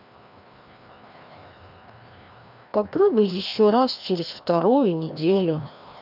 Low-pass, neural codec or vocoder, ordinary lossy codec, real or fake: 5.4 kHz; codec, 16 kHz, 1 kbps, FreqCodec, larger model; none; fake